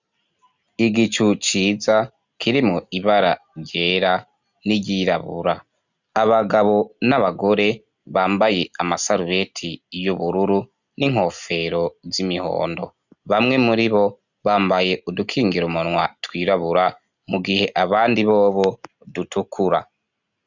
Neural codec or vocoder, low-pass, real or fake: none; 7.2 kHz; real